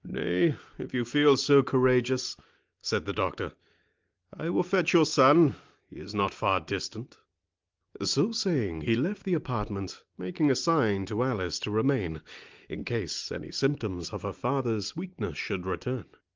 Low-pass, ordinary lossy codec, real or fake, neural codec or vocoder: 7.2 kHz; Opus, 32 kbps; real; none